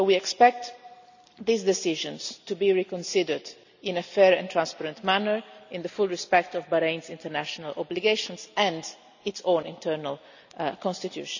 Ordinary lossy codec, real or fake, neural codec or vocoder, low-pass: none; real; none; 7.2 kHz